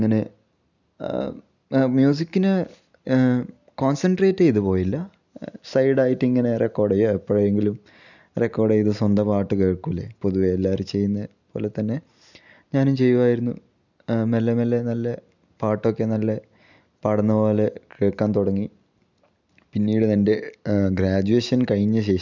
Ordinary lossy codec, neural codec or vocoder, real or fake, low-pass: none; none; real; 7.2 kHz